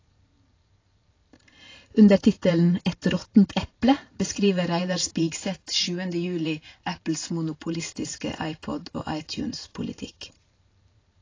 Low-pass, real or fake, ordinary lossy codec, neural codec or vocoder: 7.2 kHz; fake; AAC, 32 kbps; vocoder, 44.1 kHz, 128 mel bands every 512 samples, BigVGAN v2